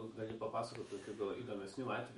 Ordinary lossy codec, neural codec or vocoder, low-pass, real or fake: MP3, 48 kbps; autoencoder, 48 kHz, 128 numbers a frame, DAC-VAE, trained on Japanese speech; 19.8 kHz; fake